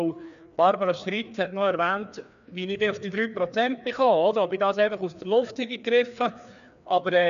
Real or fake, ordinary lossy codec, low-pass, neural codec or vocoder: fake; none; 7.2 kHz; codec, 16 kHz, 2 kbps, FreqCodec, larger model